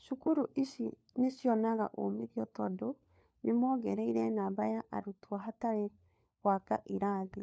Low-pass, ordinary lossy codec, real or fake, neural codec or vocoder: none; none; fake; codec, 16 kHz, 4 kbps, FunCodec, trained on LibriTTS, 50 frames a second